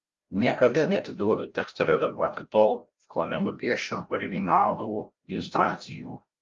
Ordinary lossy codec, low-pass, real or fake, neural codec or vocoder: Opus, 32 kbps; 7.2 kHz; fake; codec, 16 kHz, 0.5 kbps, FreqCodec, larger model